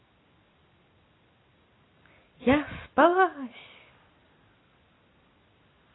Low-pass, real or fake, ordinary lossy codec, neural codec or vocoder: 7.2 kHz; real; AAC, 16 kbps; none